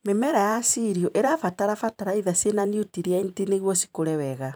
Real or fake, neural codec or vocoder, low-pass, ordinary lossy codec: fake; vocoder, 44.1 kHz, 128 mel bands every 512 samples, BigVGAN v2; none; none